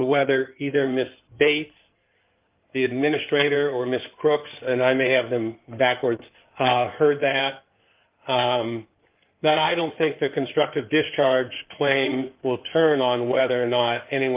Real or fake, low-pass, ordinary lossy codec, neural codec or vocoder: fake; 3.6 kHz; Opus, 24 kbps; codec, 16 kHz in and 24 kHz out, 2.2 kbps, FireRedTTS-2 codec